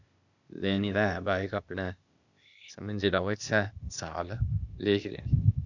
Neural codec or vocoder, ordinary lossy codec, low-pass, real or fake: codec, 16 kHz, 0.8 kbps, ZipCodec; none; 7.2 kHz; fake